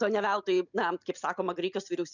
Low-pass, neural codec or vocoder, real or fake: 7.2 kHz; none; real